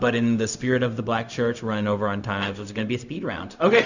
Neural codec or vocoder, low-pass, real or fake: codec, 16 kHz, 0.4 kbps, LongCat-Audio-Codec; 7.2 kHz; fake